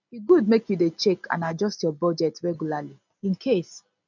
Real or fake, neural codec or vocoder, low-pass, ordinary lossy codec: real; none; 7.2 kHz; none